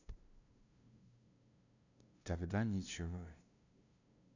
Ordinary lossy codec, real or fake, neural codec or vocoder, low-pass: none; fake; codec, 16 kHz, 0.5 kbps, FunCodec, trained on LibriTTS, 25 frames a second; 7.2 kHz